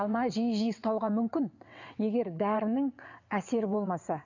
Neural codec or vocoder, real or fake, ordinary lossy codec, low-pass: vocoder, 22.05 kHz, 80 mel bands, WaveNeXt; fake; none; 7.2 kHz